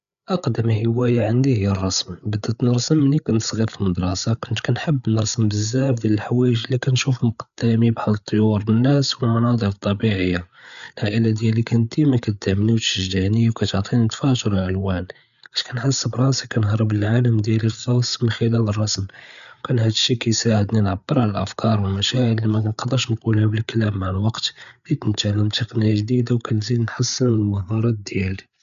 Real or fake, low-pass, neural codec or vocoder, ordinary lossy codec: fake; 7.2 kHz; codec, 16 kHz, 8 kbps, FreqCodec, larger model; none